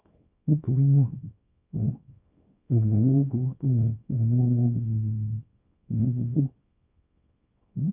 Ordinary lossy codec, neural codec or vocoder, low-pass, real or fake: none; codec, 24 kHz, 0.9 kbps, WavTokenizer, small release; 3.6 kHz; fake